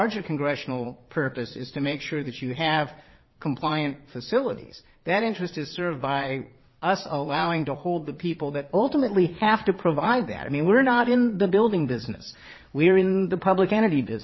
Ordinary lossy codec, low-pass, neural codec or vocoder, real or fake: MP3, 24 kbps; 7.2 kHz; vocoder, 22.05 kHz, 80 mel bands, Vocos; fake